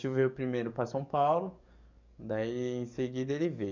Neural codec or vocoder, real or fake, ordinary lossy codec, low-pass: codec, 44.1 kHz, 7.8 kbps, DAC; fake; none; 7.2 kHz